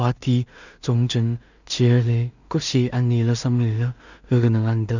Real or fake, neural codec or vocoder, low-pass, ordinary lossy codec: fake; codec, 16 kHz in and 24 kHz out, 0.4 kbps, LongCat-Audio-Codec, two codebook decoder; 7.2 kHz; none